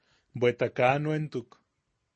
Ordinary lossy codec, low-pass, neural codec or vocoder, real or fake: MP3, 32 kbps; 9.9 kHz; none; real